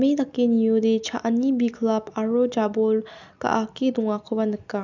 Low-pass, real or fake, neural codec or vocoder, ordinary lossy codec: 7.2 kHz; real; none; none